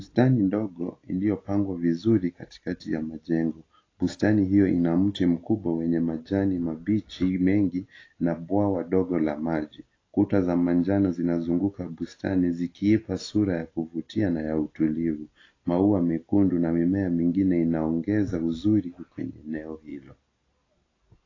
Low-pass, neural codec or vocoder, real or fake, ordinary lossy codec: 7.2 kHz; none; real; AAC, 32 kbps